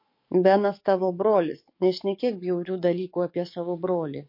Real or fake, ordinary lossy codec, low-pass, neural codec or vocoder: fake; MP3, 32 kbps; 5.4 kHz; codec, 44.1 kHz, 7.8 kbps, DAC